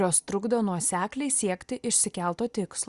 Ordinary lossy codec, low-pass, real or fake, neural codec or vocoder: Opus, 64 kbps; 10.8 kHz; real; none